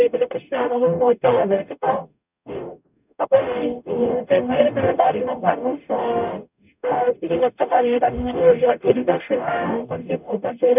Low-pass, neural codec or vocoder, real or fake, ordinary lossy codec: 3.6 kHz; codec, 44.1 kHz, 0.9 kbps, DAC; fake; none